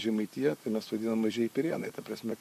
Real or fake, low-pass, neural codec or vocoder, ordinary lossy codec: fake; 14.4 kHz; vocoder, 44.1 kHz, 128 mel bands, Pupu-Vocoder; MP3, 64 kbps